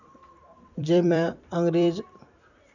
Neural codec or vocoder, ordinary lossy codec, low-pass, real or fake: vocoder, 44.1 kHz, 128 mel bands every 256 samples, BigVGAN v2; none; 7.2 kHz; fake